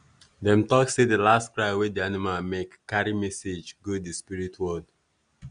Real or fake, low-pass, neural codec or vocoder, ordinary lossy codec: real; 9.9 kHz; none; none